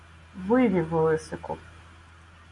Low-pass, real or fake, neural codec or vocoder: 10.8 kHz; real; none